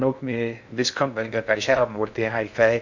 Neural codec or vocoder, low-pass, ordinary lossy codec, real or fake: codec, 16 kHz in and 24 kHz out, 0.6 kbps, FocalCodec, streaming, 2048 codes; 7.2 kHz; none; fake